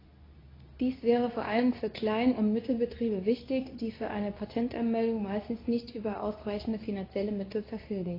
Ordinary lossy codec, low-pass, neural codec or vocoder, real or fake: AAC, 24 kbps; 5.4 kHz; codec, 24 kHz, 0.9 kbps, WavTokenizer, medium speech release version 2; fake